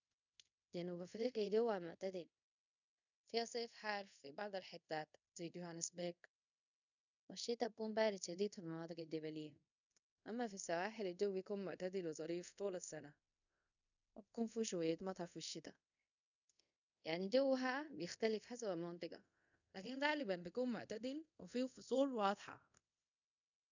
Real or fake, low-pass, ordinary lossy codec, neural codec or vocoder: fake; 7.2 kHz; none; codec, 24 kHz, 0.5 kbps, DualCodec